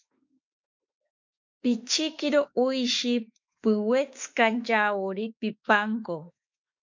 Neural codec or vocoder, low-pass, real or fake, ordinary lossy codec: autoencoder, 48 kHz, 32 numbers a frame, DAC-VAE, trained on Japanese speech; 7.2 kHz; fake; MP3, 48 kbps